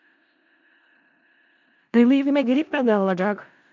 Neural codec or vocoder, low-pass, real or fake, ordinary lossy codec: codec, 16 kHz in and 24 kHz out, 0.4 kbps, LongCat-Audio-Codec, four codebook decoder; 7.2 kHz; fake; AAC, 48 kbps